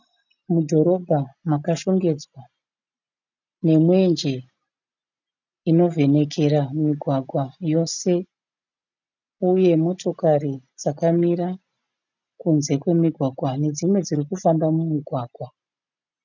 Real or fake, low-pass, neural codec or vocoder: real; 7.2 kHz; none